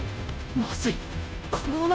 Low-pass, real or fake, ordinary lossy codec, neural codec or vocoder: none; fake; none; codec, 16 kHz, 0.5 kbps, FunCodec, trained on Chinese and English, 25 frames a second